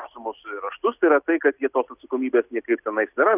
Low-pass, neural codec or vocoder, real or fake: 3.6 kHz; none; real